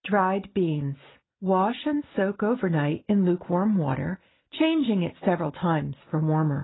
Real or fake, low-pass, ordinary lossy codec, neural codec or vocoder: real; 7.2 kHz; AAC, 16 kbps; none